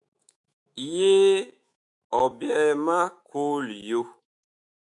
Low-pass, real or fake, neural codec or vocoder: 10.8 kHz; fake; autoencoder, 48 kHz, 128 numbers a frame, DAC-VAE, trained on Japanese speech